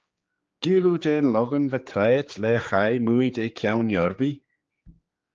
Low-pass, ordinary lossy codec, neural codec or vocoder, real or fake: 7.2 kHz; Opus, 24 kbps; codec, 16 kHz, 4 kbps, X-Codec, HuBERT features, trained on general audio; fake